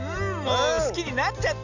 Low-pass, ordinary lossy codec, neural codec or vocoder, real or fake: 7.2 kHz; none; none; real